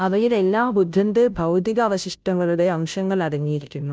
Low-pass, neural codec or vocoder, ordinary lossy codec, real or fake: none; codec, 16 kHz, 0.5 kbps, FunCodec, trained on Chinese and English, 25 frames a second; none; fake